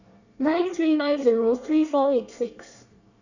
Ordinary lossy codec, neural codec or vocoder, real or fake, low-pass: none; codec, 24 kHz, 1 kbps, SNAC; fake; 7.2 kHz